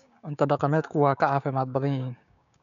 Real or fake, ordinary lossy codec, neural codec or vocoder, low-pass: fake; none; codec, 16 kHz, 6 kbps, DAC; 7.2 kHz